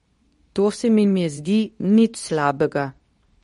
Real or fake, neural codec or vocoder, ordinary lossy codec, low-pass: fake; codec, 24 kHz, 0.9 kbps, WavTokenizer, medium speech release version 2; MP3, 48 kbps; 10.8 kHz